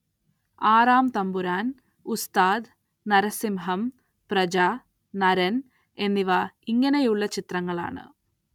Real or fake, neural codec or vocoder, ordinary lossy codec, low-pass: real; none; none; 19.8 kHz